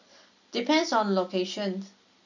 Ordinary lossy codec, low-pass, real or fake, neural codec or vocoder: none; 7.2 kHz; real; none